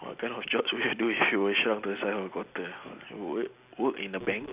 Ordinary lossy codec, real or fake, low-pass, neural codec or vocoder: Opus, 64 kbps; real; 3.6 kHz; none